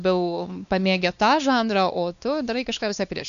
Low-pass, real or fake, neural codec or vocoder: 7.2 kHz; fake; codec, 16 kHz, 2 kbps, X-Codec, WavLM features, trained on Multilingual LibriSpeech